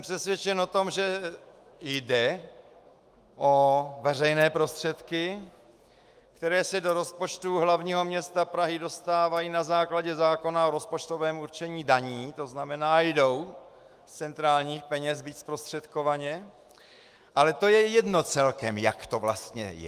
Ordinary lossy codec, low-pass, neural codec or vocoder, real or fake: Opus, 32 kbps; 14.4 kHz; autoencoder, 48 kHz, 128 numbers a frame, DAC-VAE, trained on Japanese speech; fake